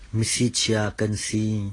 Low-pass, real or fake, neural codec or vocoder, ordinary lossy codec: 10.8 kHz; real; none; AAC, 32 kbps